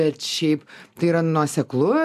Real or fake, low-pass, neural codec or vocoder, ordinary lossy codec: real; 14.4 kHz; none; MP3, 96 kbps